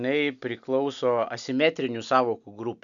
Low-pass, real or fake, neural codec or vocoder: 7.2 kHz; real; none